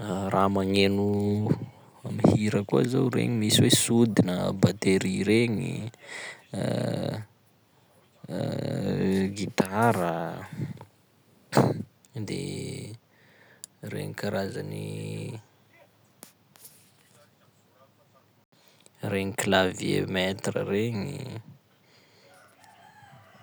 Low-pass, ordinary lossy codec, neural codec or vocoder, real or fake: none; none; none; real